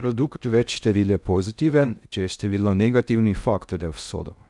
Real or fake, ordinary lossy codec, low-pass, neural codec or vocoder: fake; none; 10.8 kHz; codec, 16 kHz in and 24 kHz out, 0.6 kbps, FocalCodec, streaming, 2048 codes